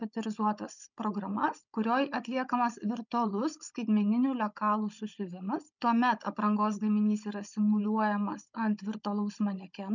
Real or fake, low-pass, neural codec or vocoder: fake; 7.2 kHz; codec, 16 kHz, 16 kbps, FunCodec, trained on Chinese and English, 50 frames a second